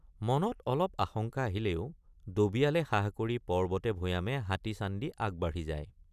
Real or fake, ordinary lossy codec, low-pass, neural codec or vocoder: fake; none; 14.4 kHz; vocoder, 44.1 kHz, 128 mel bands every 256 samples, BigVGAN v2